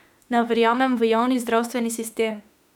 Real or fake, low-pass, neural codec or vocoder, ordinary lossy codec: fake; 19.8 kHz; autoencoder, 48 kHz, 32 numbers a frame, DAC-VAE, trained on Japanese speech; none